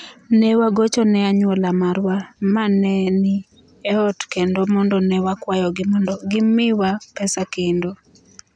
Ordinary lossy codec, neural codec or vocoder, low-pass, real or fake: none; none; 9.9 kHz; real